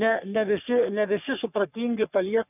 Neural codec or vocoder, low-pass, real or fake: codec, 44.1 kHz, 3.4 kbps, Pupu-Codec; 3.6 kHz; fake